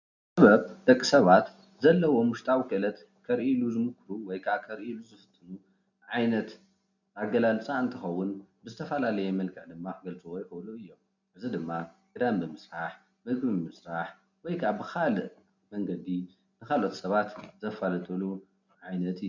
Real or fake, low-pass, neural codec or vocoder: real; 7.2 kHz; none